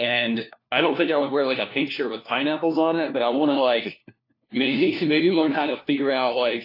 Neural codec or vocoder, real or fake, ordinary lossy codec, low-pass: codec, 16 kHz, 1 kbps, FunCodec, trained on LibriTTS, 50 frames a second; fake; AAC, 32 kbps; 5.4 kHz